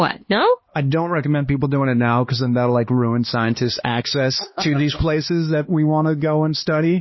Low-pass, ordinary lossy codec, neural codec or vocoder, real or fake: 7.2 kHz; MP3, 24 kbps; codec, 16 kHz, 2 kbps, X-Codec, HuBERT features, trained on LibriSpeech; fake